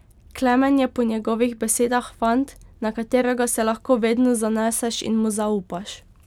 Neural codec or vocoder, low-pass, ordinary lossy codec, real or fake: none; 19.8 kHz; none; real